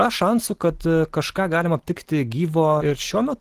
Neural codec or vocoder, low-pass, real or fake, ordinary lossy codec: none; 14.4 kHz; real; Opus, 16 kbps